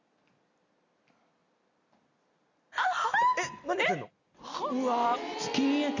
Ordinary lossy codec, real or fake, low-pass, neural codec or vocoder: none; real; 7.2 kHz; none